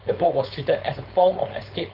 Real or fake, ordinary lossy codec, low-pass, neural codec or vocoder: fake; none; 5.4 kHz; codec, 16 kHz, 4.8 kbps, FACodec